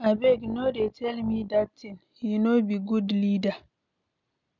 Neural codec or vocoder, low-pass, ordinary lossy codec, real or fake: none; 7.2 kHz; none; real